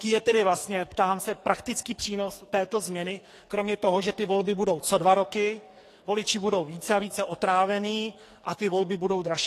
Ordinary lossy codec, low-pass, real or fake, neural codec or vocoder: AAC, 48 kbps; 14.4 kHz; fake; codec, 44.1 kHz, 2.6 kbps, SNAC